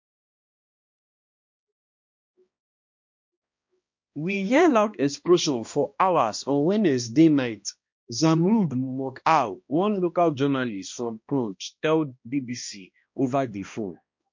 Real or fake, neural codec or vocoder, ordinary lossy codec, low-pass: fake; codec, 16 kHz, 1 kbps, X-Codec, HuBERT features, trained on balanced general audio; MP3, 48 kbps; 7.2 kHz